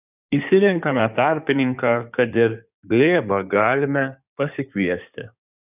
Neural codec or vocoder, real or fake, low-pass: codec, 16 kHz in and 24 kHz out, 2.2 kbps, FireRedTTS-2 codec; fake; 3.6 kHz